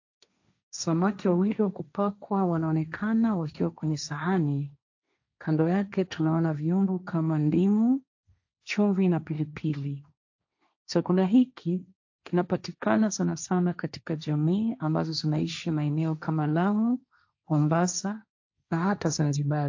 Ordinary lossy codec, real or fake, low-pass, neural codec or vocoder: AAC, 48 kbps; fake; 7.2 kHz; codec, 16 kHz, 1.1 kbps, Voila-Tokenizer